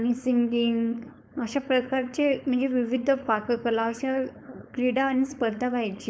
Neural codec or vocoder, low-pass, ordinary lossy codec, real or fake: codec, 16 kHz, 4.8 kbps, FACodec; none; none; fake